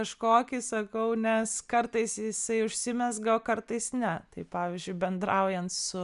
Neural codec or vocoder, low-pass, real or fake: none; 10.8 kHz; real